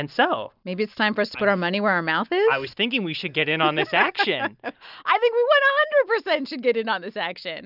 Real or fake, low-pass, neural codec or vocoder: real; 5.4 kHz; none